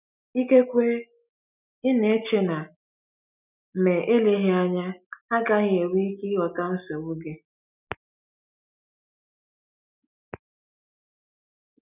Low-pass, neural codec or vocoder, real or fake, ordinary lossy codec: 3.6 kHz; none; real; none